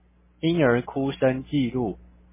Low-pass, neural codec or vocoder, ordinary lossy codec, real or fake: 3.6 kHz; none; MP3, 16 kbps; real